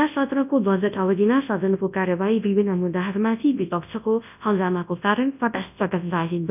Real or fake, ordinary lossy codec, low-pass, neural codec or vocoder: fake; none; 3.6 kHz; codec, 24 kHz, 0.9 kbps, WavTokenizer, large speech release